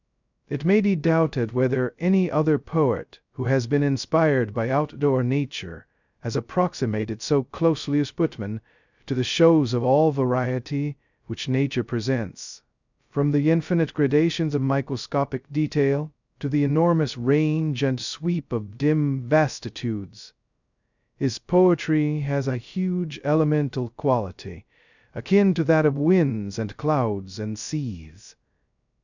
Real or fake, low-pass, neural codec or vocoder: fake; 7.2 kHz; codec, 16 kHz, 0.2 kbps, FocalCodec